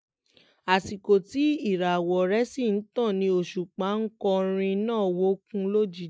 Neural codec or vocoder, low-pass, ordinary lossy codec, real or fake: none; none; none; real